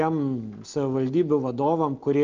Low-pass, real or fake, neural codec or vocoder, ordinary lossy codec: 7.2 kHz; real; none; Opus, 24 kbps